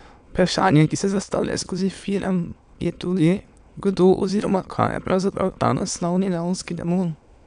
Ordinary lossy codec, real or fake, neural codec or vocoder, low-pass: none; fake; autoencoder, 22.05 kHz, a latent of 192 numbers a frame, VITS, trained on many speakers; 9.9 kHz